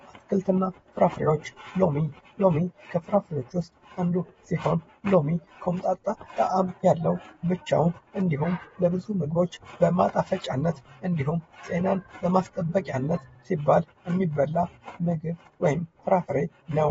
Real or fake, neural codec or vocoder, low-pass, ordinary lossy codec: fake; vocoder, 48 kHz, 128 mel bands, Vocos; 19.8 kHz; AAC, 24 kbps